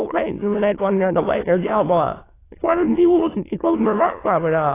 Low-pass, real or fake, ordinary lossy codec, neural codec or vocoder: 3.6 kHz; fake; AAC, 16 kbps; autoencoder, 22.05 kHz, a latent of 192 numbers a frame, VITS, trained on many speakers